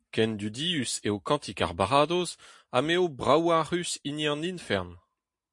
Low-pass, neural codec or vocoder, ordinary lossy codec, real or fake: 10.8 kHz; none; MP3, 48 kbps; real